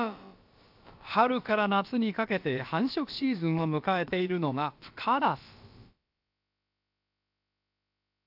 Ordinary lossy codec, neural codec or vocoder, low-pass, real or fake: AAC, 48 kbps; codec, 16 kHz, about 1 kbps, DyCAST, with the encoder's durations; 5.4 kHz; fake